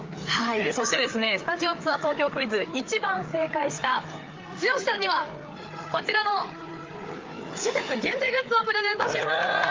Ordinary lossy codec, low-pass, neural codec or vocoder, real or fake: Opus, 32 kbps; 7.2 kHz; codec, 16 kHz, 4 kbps, FreqCodec, larger model; fake